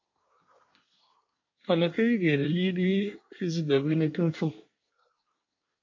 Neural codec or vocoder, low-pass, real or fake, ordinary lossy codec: codec, 24 kHz, 1 kbps, SNAC; 7.2 kHz; fake; MP3, 48 kbps